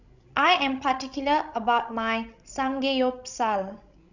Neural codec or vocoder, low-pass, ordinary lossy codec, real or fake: codec, 16 kHz, 16 kbps, FreqCodec, larger model; 7.2 kHz; none; fake